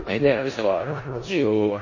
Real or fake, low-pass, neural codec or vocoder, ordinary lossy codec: fake; 7.2 kHz; codec, 16 kHz in and 24 kHz out, 0.4 kbps, LongCat-Audio-Codec, four codebook decoder; MP3, 32 kbps